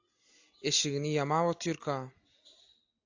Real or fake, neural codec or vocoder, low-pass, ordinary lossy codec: real; none; 7.2 kHz; AAC, 48 kbps